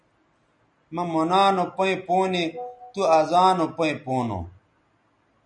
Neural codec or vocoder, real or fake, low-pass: none; real; 9.9 kHz